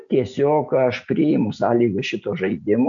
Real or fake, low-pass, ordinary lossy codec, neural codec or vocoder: real; 7.2 kHz; MP3, 64 kbps; none